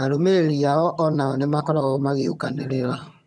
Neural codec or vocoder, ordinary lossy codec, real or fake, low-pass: vocoder, 22.05 kHz, 80 mel bands, HiFi-GAN; none; fake; none